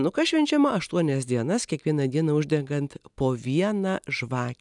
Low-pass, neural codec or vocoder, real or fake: 10.8 kHz; none; real